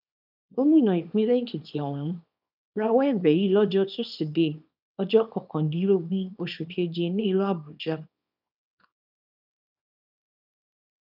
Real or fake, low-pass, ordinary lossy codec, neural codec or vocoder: fake; 5.4 kHz; none; codec, 24 kHz, 0.9 kbps, WavTokenizer, small release